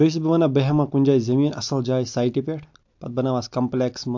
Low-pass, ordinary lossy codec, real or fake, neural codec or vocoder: 7.2 kHz; MP3, 48 kbps; real; none